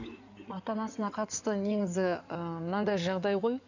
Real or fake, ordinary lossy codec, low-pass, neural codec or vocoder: fake; none; 7.2 kHz; codec, 16 kHz in and 24 kHz out, 2.2 kbps, FireRedTTS-2 codec